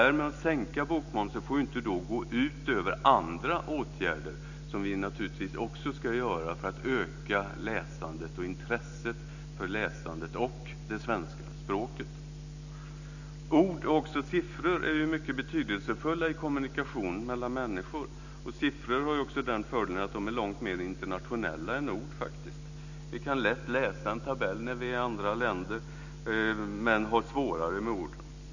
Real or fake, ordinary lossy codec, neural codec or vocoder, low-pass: real; none; none; 7.2 kHz